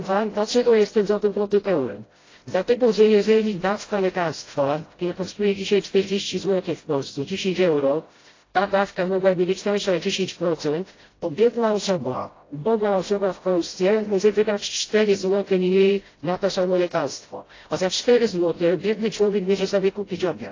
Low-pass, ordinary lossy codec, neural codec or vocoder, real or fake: 7.2 kHz; AAC, 32 kbps; codec, 16 kHz, 0.5 kbps, FreqCodec, smaller model; fake